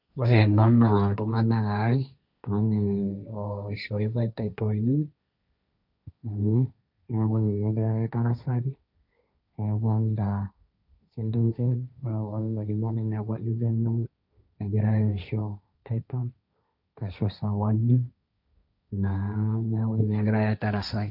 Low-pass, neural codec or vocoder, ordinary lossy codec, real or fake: 5.4 kHz; codec, 16 kHz, 1.1 kbps, Voila-Tokenizer; none; fake